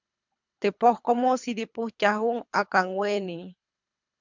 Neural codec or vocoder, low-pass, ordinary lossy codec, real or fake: codec, 24 kHz, 3 kbps, HILCodec; 7.2 kHz; MP3, 64 kbps; fake